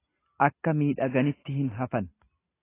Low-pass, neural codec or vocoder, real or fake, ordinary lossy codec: 3.6 kHz; none; real; AAC, 16 kbps